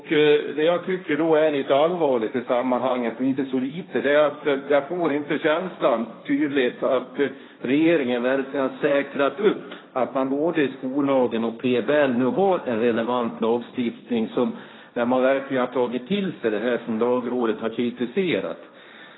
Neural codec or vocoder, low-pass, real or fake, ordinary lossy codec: codec, 16 kHz, 1.1 kbps, Voila-Tokenizer; 7.2 kHz; fake; AAC, 16 kbps